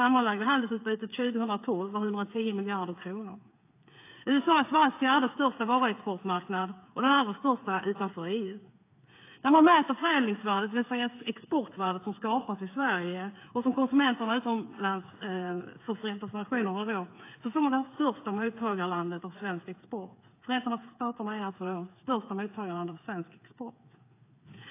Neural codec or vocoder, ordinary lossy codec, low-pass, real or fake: codec, 16 kHz, 8 kbps, FreqCodec, smaller model; AAC, 24 kbps; 3.6 kHz; fake